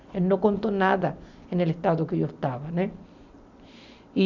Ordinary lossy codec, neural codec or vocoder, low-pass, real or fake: none; none; 7.2 kHz; real